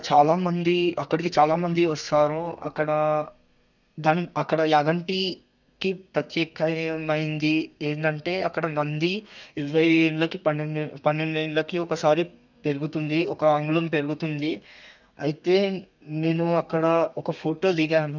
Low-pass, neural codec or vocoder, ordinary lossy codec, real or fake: 7.2 kHz; codec, 32 kHz, 1.9 kbps, SNAC; none; fake